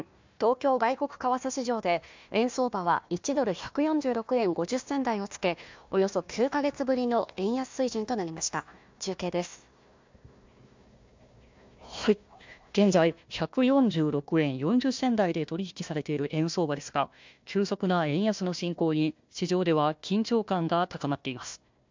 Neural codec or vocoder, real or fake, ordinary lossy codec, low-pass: codec, 16 kHz, 1 kbps, FunCodec, trained on Chinese and English, 50 frames a second; fake; MP3, 64 kbps; 7.2 kHz